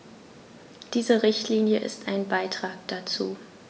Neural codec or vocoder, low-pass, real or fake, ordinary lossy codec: none; none; real; none